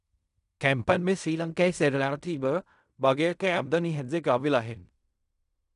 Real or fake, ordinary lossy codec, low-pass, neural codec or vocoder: fake; none; 10.8 kHz; codec, 16 kHz in and 24 kHz out, 0.4 kbps, LongCat-Audio-Codec, fine tuned four codebook decoder